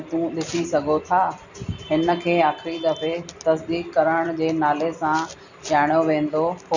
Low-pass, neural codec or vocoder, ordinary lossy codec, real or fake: 7.2 kHz; none; none; real